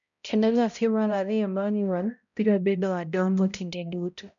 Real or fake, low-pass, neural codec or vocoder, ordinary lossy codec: fake; 7.2 kHz; codec, 16 kHz, 0.5 kbps, X-Codec, HuBERT features, trained on balanced general audio; none